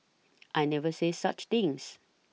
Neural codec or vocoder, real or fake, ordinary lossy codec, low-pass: none; real; none; none